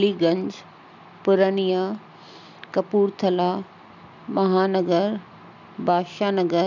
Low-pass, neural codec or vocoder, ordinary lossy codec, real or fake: 7.2 kHz; none; none; real